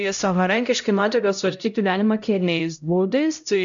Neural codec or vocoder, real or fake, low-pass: codec, 16 kHz, 0.5 kbps, X-Codec, HuBERT features, trained on LibriSpeech; fake; 7.2 kHz